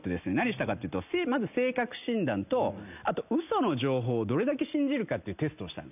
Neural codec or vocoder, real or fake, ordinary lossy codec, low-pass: none; real; none; 3.6 kHz